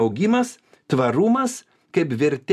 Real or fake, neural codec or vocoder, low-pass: real; none; 14.4 kHz